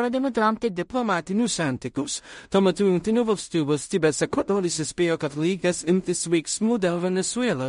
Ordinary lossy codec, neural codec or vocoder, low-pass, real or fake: MP3, 48 kbps; codec, 16 kHz in and 24 kHz out, 0.4 kbps, LongCat-Audio-Codec, two codebook decoder; 10.8 kHz; fake